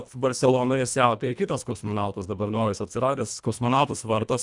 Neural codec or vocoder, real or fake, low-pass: codec, 24 kHz, 1.5 kbps, HILCodec; fake; 10.8 kHz